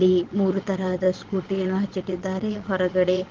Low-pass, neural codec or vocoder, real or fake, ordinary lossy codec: 7.2 kHz; vocoder, 22.05 kHz, 80 mel bands, Vocos; fake; Opus, 16 kbps